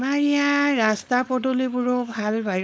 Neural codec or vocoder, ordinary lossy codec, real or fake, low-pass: codec, 16 kHz, 4.8 kbps, FACodec; none; fake; none